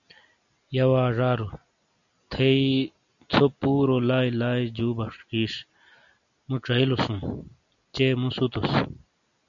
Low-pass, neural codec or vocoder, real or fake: 7.2 kHz; none; real